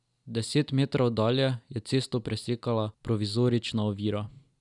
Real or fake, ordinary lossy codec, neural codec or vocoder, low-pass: real; none; none; 10.8 kHz